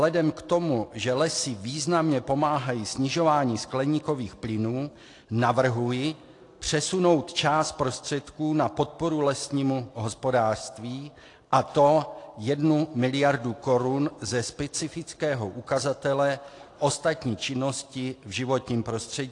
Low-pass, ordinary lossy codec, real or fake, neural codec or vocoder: 10.8 kHz; AAC, 48 kbps; real; none